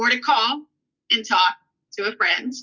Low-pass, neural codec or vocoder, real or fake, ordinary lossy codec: 7.2 kHz; none; real; Opus, 64 kbps